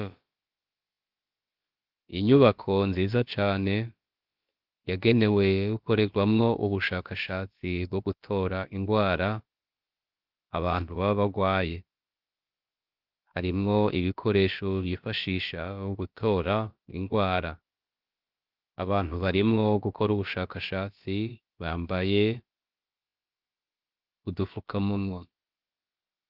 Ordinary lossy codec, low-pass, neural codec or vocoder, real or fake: Opus, 32 kbps; 5.4 kHz; codec, 16 kHz, about 1 kbps, DyCAST, with the encoder's durations; fake